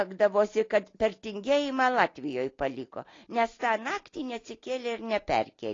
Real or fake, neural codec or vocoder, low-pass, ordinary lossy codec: real; none; 7.2 kHz; AAC, 32 kbps